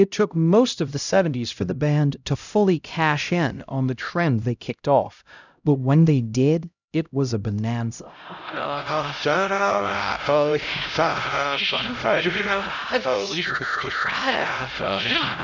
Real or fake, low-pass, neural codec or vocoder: fake; 7.2 kHz; codec, 16 kHz, 0.5 kbps, X-Codec, HuBERT features, trained on LibriSpeech